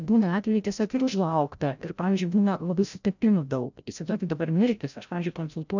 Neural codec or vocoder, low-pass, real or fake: codec, 16 kHz, 0.5 kbps, FreqCodec, larger model; 7.2 kHz; fake